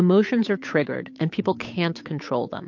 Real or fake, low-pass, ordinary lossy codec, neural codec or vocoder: fake; 7.2 kHz; MP3, 48 kbps; codec, 16 kHz, 8 kbps, FunCodec, trained on Chinese and English, 25 frames a second